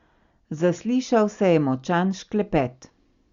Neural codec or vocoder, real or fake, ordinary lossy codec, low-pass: none; real; Opus, 64 kbps; 7.2 kHz